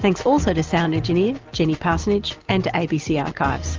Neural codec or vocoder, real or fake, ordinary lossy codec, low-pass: none; real; Opus, 32 kbps; 7.2 kHz